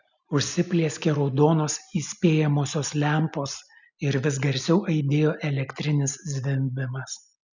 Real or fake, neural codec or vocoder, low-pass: real; none; 7.2 kHz